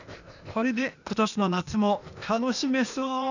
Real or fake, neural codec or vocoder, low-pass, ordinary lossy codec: fake; codec, 16 kHz, 0.8 kbps, ZipCodec; 7.2 kHz; none